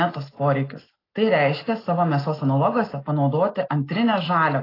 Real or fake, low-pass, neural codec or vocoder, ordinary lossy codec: real; 5.4 kHz; none; AAC, 24 kbps